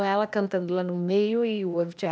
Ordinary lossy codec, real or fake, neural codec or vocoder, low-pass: none; fake; codec, 16 kHz, 0.8 kbps, ZipCodec; none